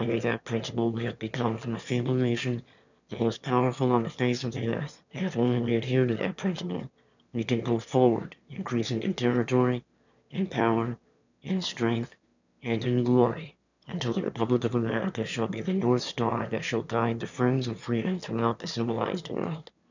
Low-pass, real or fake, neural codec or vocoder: 7.2 kHz; fake; autoencoder, 22.05 kHz, a latent of 192 numbers a frame, VITS, trained on one speaker